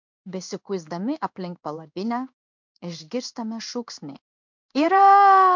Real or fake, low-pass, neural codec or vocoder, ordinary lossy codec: fake; 7.2 kHz; codec, 16 kHz in and 24 kHz out, 1 kbps, XY-Tokenizer; MP3, 64 kbps